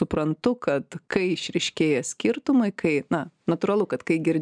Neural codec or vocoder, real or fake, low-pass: none; real; 9.9 kHz